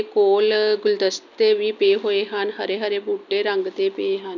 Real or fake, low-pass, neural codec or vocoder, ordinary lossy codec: real; 7.2 kHz; none; none